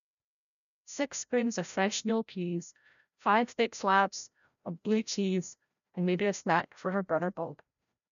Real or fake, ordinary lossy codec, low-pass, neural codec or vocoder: fake; none; 7.2 kHz; codec, 16 kHz, 0.5 kbps, FreqCodec, larger model